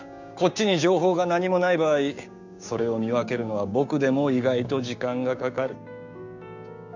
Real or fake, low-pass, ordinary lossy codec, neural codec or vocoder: fake; 7.2 kHz; none; codec, 16 kHz, 6 kbps, DAC